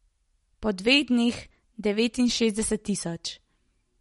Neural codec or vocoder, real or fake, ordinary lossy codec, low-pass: none; real; MP3, 48 kbps; 19.8 kHz